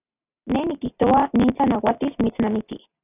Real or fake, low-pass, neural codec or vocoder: real; 3.6 kHz; none